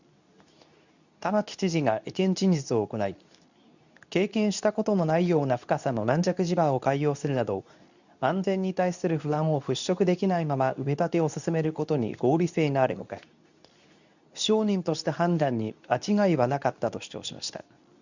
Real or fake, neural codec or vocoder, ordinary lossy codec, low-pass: fake; codec, 24 kHz, 0.9 kbps, WavTokenizer, medium speech release version 2; none; 7.2 kHz